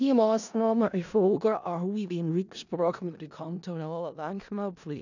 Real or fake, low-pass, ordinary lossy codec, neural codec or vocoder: fake; 7.2 kHz; none; codec, 16 kHz in and 24 kHz out, 0.4 kbps, LongCat-Audio-Codec, four codebook decoder